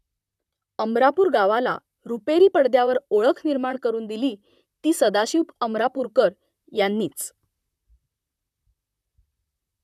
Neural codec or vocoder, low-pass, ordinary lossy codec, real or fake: vocoder, 44.1 kHz, 128 mel bands, Pupu-Vocoder; 14.4 kHz; none; fake